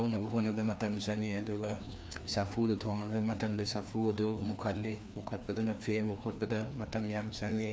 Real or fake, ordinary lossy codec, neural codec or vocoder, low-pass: fake; none; codec, 16 kHz, 2 kbps, FreqCodec, larger model; none